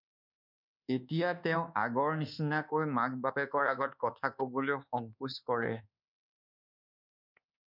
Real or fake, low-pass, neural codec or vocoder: fake; 5.4 kHz; codec, 24 kHz, 1.2 kbps, DualCodec